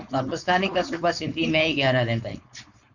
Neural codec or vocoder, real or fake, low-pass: codec, 16 kHz, 4.8 kbps, FACodec; fake; 7.2 kHz